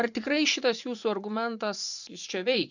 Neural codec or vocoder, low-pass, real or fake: vocoder, 24 kHz, 100 mel bands, Vocos; 7.2 kHz; fake